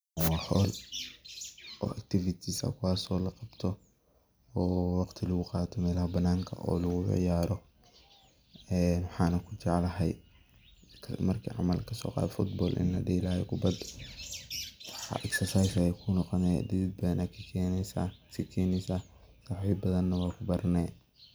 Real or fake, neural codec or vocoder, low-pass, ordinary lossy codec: real; none; none; none